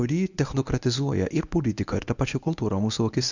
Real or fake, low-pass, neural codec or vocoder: fake; 7.2 kHz; codec, 24 kHz, 0.9 kbps, WavTokenizer, medium speech release version 1